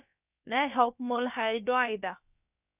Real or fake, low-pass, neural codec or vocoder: fake; 3.6 kHz; codec, 16 kHz, about 1 kbps, DyCAST, with the encoder's durations